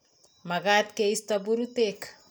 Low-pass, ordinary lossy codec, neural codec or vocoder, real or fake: none; none; none; real